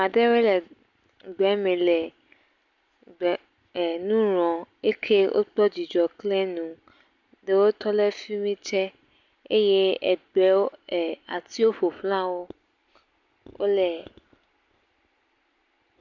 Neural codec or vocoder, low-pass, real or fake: none; 7.2 kHz; real